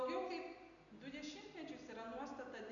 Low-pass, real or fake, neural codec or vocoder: 7.2 kHz; real; none